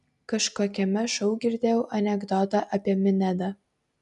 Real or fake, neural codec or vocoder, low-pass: real; none; 10.8 kHz